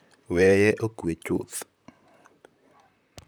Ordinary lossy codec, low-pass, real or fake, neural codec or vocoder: none; none; fake; vocoder, 44.1 kHz, 128 mel bands, Pupu-Vocoder